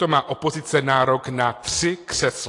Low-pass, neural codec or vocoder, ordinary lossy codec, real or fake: 10.8 kHz; none; AAC, 48 kbps; real